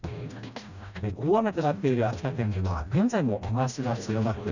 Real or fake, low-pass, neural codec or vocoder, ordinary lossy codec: fake; 7.2 kHz; codec, 16 kHz, 1 kbps, FreqCodec, smaller model; none